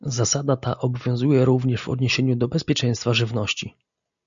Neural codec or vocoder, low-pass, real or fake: none; 7.2 kHz; real